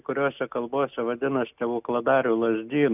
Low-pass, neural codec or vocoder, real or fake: 3.6 kHz; none; real